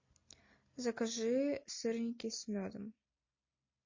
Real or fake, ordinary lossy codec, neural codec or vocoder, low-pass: real; MP3, 32 kbps; none; 7.2 kHz